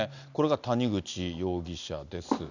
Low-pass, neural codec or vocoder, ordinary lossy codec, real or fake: 7.2 kHz; none; none; real